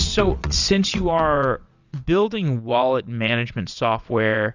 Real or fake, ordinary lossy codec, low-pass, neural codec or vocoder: fake; Opus, 64 kbps; 7.2 kHz; vocoder, 22.05 kHz, 80 mel bands, WaveNeXt